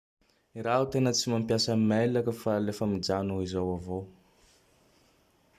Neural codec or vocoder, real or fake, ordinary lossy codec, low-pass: none; real; Opus, 64 kbps; 14.4 kHz